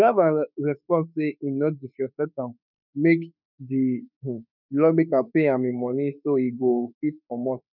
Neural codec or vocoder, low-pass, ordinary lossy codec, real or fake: autoencoder, 48 kHz, 32 numbers a frame, DAC-VAE, trained on Japanese speech; 5.4 kHz; none; fake